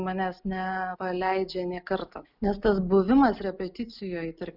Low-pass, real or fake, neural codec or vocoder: 5.4 kHz; real; none